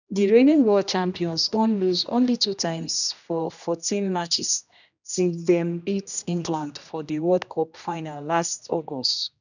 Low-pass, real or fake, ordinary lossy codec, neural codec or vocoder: 7.2 kHz; fake; none; codec, 16 kHz, 1 kbps, X-Codec, HuBERT features, trained on general audio